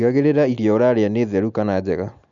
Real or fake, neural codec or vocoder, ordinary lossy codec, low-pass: real; none; none; 7.2 kHz